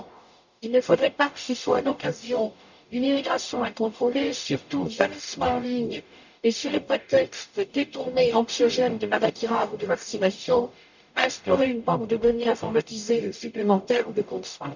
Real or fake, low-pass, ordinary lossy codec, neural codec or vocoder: fake; 7.2 kHz; none; codec, 44.1 kHz, 0.9 kbps, DAC